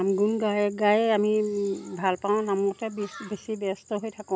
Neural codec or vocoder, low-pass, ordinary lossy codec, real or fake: none; none; none; real